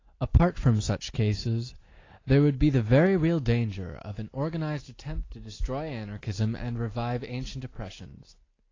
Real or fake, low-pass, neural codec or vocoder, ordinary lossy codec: real; 7.2 kHz; none; AAC, 32 kbps